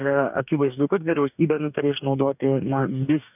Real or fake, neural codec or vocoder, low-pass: fake; codec, 44.1 kHz, 2.6 kbps, DAC; 3.6 kHz